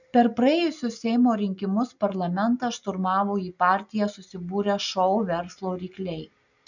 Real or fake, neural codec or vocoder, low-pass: real; none; 7.2 kHz